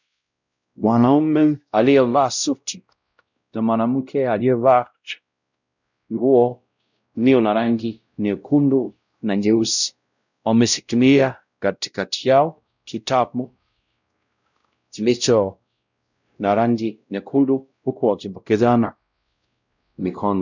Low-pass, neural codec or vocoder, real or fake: 7.2 kHz; codec, 16 kHz, 0.5 kbps, X-Codec, WavLM features, trained on Multilingual LibriSpeech; fake